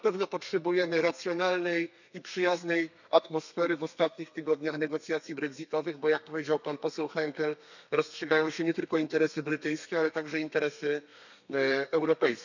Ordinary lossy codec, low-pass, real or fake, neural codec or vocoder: none; 7.2 kHz; fake; codec, 32 kHz, 1.9 kbps, SNAC